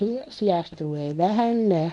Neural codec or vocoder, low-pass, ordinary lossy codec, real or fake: codec, 24 kHz, 0.9 kbps, WavTokenizer, medium speech release version 1; 10.8 kHz; none; fake